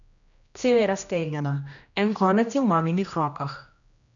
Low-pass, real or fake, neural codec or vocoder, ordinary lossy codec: 7.2 kHz; fake; codec, 16 kHz, 1 kbps, X-Codec, HuBERT features, trained on general audio; none